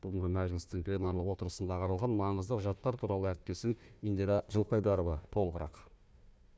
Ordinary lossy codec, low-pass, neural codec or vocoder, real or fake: none; none; codec, 16 kHz, 1 kbps, FunCodec, trained on Chinese and English, 50 frames a second; fake